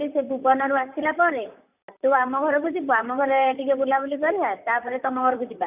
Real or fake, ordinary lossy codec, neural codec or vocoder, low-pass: fake; none; vocoder, 44.1 kHz, 128 mel bands, Pupu-Vocoder; 3.6 kHz